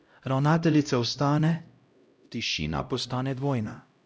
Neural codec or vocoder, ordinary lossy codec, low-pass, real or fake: codec, 16 kHz, 0.5 kbps, X-Codec, HuBERT features, trained on LibriSpeech; none; none; fake